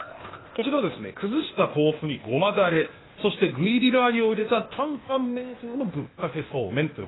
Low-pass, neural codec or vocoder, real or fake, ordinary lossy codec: 7.2 kHz; codec, 16 kHz, 0.8 kbps, ZipCodec; fake; AAC, 16 kbps